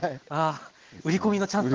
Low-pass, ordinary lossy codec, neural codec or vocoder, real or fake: 7.2 kHz; Opus, 16 kbps; none; real